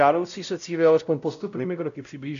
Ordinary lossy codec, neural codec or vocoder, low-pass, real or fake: AAC, 96 kbps; codec, 16 kHz, 0.5 kbps, X-Codec, WavLM features, trained on Multilingual LibriSpeech; 7.2 kHz; fake